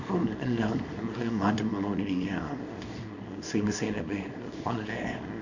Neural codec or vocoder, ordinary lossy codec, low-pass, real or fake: codec, 24 kHz, 0.9 kbps, WavTokenizer, small release; none; 7.2 kHz; fake